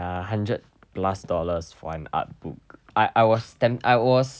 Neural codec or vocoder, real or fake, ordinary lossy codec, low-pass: none; real; none; none